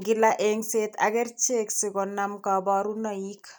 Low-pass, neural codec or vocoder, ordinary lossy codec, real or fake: none; none; none; real